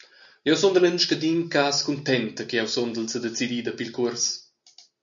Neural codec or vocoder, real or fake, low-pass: none; real; 7.2 kHz